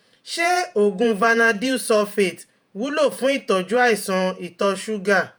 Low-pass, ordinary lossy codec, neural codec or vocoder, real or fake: none; none; vocoder, 48 kHz, 128 mel bands, Vocos; fake